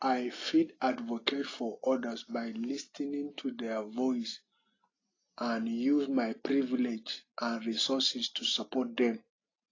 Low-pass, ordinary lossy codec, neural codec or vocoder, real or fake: 7.2 kHz; AAC, 32 kbps; none; real